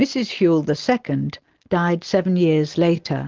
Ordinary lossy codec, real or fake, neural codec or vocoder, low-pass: Opus, 16 kbps; real; none; 7.2 kHz